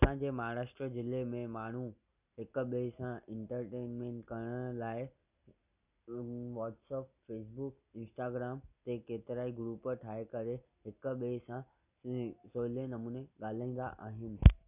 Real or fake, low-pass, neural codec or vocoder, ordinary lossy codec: real; 3.6 kHz; none; none